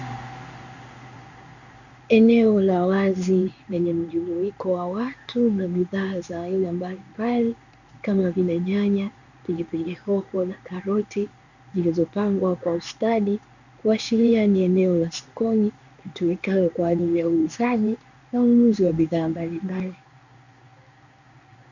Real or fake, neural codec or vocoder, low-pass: fake; codec, 16 kHz in and 24 kHz out, 1 kbps, XY-Tokenizer; 7.2 kHz